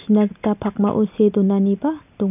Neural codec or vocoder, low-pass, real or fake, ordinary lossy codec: none; 3.6 kHz; real; none